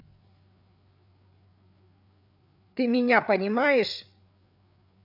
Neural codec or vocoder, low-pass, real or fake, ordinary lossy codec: codec, 16 kHz, 8 kbps, FreqCodec, larger model; 5.4 kHz; fake; none